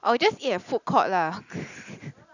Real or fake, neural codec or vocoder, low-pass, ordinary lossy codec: real; none; 7.2 kHz; none